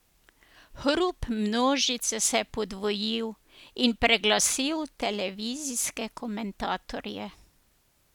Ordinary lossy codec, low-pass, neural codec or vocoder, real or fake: none; 19.8 kHz; none; real